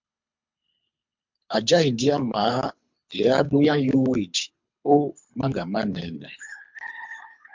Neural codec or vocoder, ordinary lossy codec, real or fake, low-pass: codec, 24 kHz, 3 kbps, HILCodec; MP3, 64 kbps; fake; 7.2 kHz